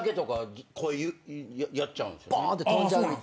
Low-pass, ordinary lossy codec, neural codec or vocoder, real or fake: none; none; none; real